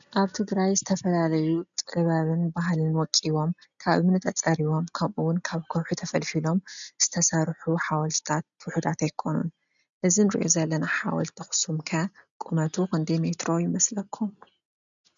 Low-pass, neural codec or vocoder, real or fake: 7.2 kHz; none; real